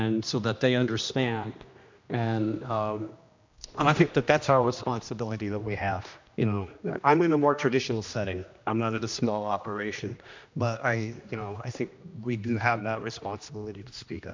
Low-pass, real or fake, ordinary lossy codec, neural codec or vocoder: 7.2 kHz; fake; MP3, 64 kbps; codec, 16 kHz, 1 kbps, X-Codec, HuBERT features, trained on general audio